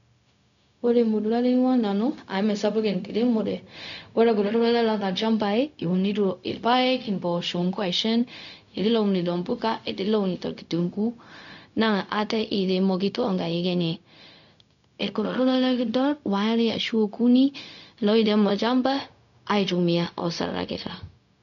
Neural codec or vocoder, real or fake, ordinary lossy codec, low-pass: codec, 16 kHz, 0.4 kbps, LongCat-Audio-Codec; fake; none; 7.2 kHz